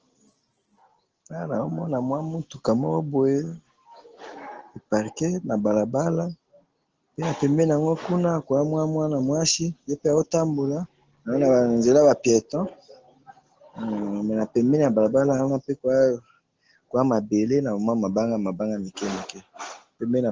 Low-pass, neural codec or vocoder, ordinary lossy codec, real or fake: 7.2 kHz; none; Opus, 16 kbps; real